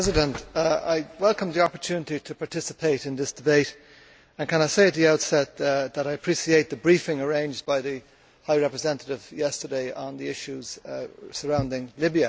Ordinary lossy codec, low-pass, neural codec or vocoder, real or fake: none; none; none; real